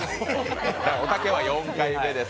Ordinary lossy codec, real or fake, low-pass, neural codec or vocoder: none; real; none; none